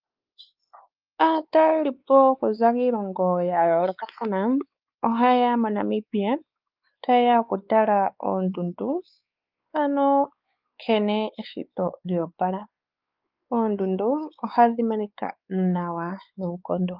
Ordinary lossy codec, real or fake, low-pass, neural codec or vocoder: Opus, 24 kbps; fake; 5.4 kHz; codec, 16 kHz, 4 kbps, X-Codec, WavLM features, trained on Multilingual LibriSpeech